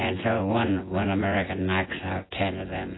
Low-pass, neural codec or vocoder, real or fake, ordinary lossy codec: 7.2 kHz; vocoder, 24 kHz, 100 mel bands, Vocos; fake; AAC, 16 kbps